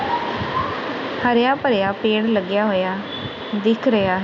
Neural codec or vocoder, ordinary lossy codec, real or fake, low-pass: none; none; real; 7.2 kHz